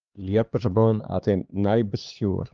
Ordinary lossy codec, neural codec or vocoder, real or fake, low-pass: Opus, 24 kbps; codec, 16 kHz, 2 kbps, X-Codec, HuBERT features, trained on LibriSpeech; fake; 7.2 kHz